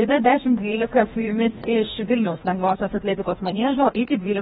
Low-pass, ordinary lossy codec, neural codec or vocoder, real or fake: 7.2 kHz; AAC, 16 kbps; codec, 16 kHz, 1 kbps, FreqCodec, larger model; fake